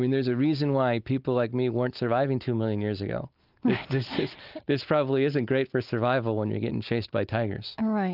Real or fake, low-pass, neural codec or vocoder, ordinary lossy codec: real; 5.4 kHz; none; Opus, 24 kbps